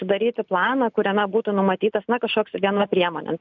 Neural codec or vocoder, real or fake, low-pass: none; real; 7.2 kHz